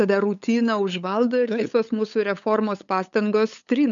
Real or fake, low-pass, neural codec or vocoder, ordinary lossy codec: fake; 7.2 kHz; codec, 16 kHz, 8 kbps, FunCodec, trained on LibriTTS, 25 frames a second; MP3, 64 kbps